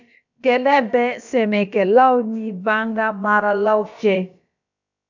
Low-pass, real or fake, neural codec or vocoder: 7.2 kHz; fake; codec, 16 kHz, about 1 kbps, DyCAST, with the encoder's durations